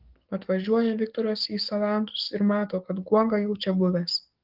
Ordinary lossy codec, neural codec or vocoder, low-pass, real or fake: Opus, 32 kbps; codec, 44.1 kHz, 7.8 kbps, DAC; 5.4 kHz; fake